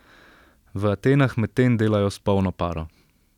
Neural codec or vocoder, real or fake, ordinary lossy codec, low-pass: none; real; none; 19.8 kHz